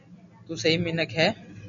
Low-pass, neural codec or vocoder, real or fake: 7.2 kHz; none; real